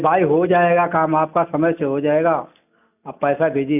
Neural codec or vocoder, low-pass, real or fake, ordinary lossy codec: none; 3.6 kHz; real; none